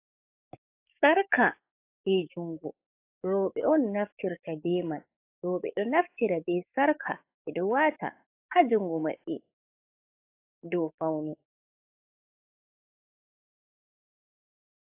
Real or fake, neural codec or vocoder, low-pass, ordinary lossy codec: fake; codec, 44.1 kHz, 7.8 kbps, DAC; 3.6 kHz; AAC, 24 kbps